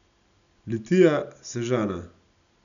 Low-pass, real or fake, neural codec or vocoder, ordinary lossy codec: 7.2 kHz; real; none; none